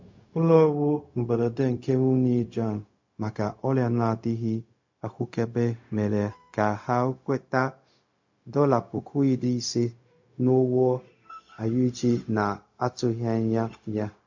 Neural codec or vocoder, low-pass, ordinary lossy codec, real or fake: codec, 16 kHz, 0.4 kbps, LongCat-Audio-Codec; 7.2 kHz; MP3, 48 kbps; fake